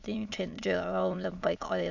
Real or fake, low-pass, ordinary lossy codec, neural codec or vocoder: fake; 7.2 kHz; none; autoencoder, 22.05 kHz, a latent of 192 numbers a frame, VITS, trained on many speakers